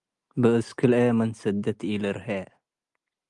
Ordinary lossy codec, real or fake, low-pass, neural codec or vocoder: Opus, 32 kbps; real; 10.8 kHz; none